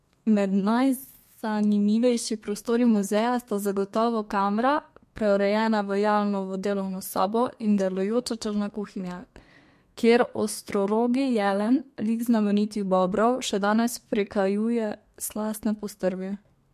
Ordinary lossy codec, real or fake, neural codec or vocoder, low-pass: MP3, 64 kbps; fake; codec, 32 kHz, 1.9 kbps, SNAC; 14.4 kHz